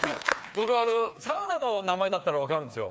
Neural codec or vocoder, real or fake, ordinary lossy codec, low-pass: codec, 16 kHz, 2 kbps, FreqCodec, larger model; fake; none; none